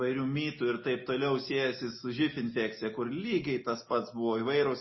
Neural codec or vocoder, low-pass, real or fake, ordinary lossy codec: none; 7.2 kHz; real; MP3, 24 kbps